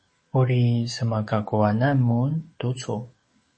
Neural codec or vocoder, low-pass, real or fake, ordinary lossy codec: codec, 44.1 kHz, 7.8 kbps, DAC; 9.9 kHz; fake; MP3, 32 kbps